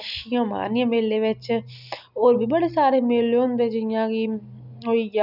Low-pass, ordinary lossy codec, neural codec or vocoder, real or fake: 5.4 kHz; none; none; real